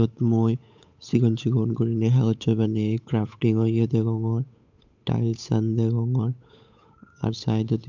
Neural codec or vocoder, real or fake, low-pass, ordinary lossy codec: codec, 16 kHz, 8 kbps, FunCodec, trained on Chinese and English, 25 frames a second; fake; 7.2 kHz; MP3, 64 kbps